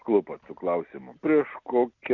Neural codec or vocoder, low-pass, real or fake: none; 7.2 kHz; real